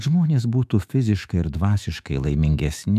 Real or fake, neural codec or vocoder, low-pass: fake; autoencoder, 48 kHz, 128 numbers a frame, DAC-VAE, trained on Japanese speech; 14.4 kHz